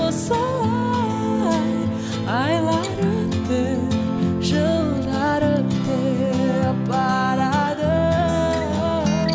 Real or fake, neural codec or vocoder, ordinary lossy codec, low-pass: real; none; none; none